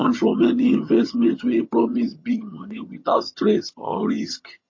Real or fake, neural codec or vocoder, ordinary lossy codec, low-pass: fake; vocoder, 22.05 kHz, 80 mel bands, HiFi-GAN; MP3, 32 kbps; 7.2 kHz